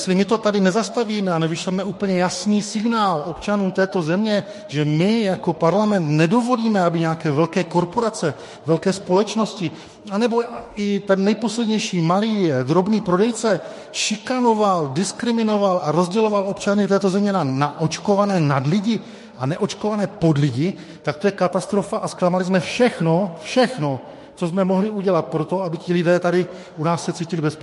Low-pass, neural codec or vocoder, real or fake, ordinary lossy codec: 14.4 kHz; autoencoder, 48 kHz, 32 numbers a frame, DAC-VAE, trained on Japanese speech; fake; MP3, 48 kbps